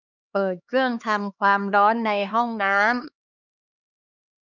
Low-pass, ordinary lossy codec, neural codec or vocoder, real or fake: 7.2 kHz; none; codec, 16 kHz, 2 kbps, X-Codec, HuBERT features, trained on LibriSpeech; fake